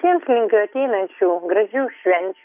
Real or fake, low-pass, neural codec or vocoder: real; 3.6 kHz; none